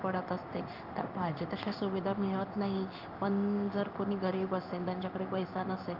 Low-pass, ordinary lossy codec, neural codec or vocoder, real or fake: 5.4 kHz; Opus, 24 kbps; codec, 16 kHz in and 24 kHz out, 1 kbps, XY-Tokenizer; fake